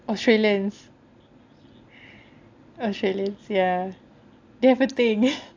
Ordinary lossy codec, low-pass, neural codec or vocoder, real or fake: AAC, 48 kbps; 7.2 kHz; none; real